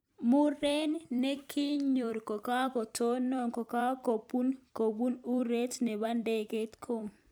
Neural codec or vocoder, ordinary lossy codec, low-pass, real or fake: vocoder, 44.1 kHz, 128 mel bands every 256 samples, BigVGAN v2; none; none; fake